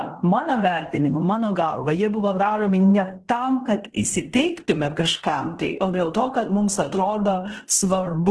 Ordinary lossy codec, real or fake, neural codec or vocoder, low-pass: Opus, 16 kbps; fake; codec, 16 kHz in and 24 kHz out, 0.9 kbps, LongCat-Audio-Codec, fine tuned four codebook decoder; 10.8 kHz